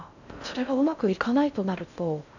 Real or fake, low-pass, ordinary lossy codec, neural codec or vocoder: fake; 7.2 kHz; none; codec, 16 kHz in and 24 kHz out, 0.6 kbps, FocalCodec, streaming, 4096 codes